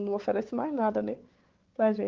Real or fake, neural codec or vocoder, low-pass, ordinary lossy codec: fake; codec, 16 kHz, 4 kbps, X-Codec, WavLM features, trained on Multilingual LibriSpeech; 7.2 kHz; Opus, 16 kbps